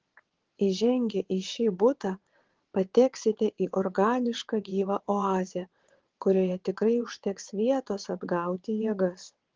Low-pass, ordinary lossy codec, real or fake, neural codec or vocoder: 7.2 kHz; Opus, 16 kbps; fake; vocoder, 22.05 kHz, 80 mel bands, WaveNeXt